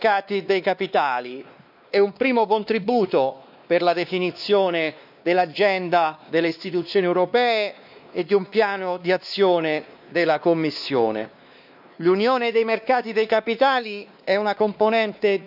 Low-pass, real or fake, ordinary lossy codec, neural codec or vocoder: 5.4 kHz; fake; none; codec, 16 kHz, 2 kbps, X-Codec, WavLM features, trained on Multilingual LibriSpeech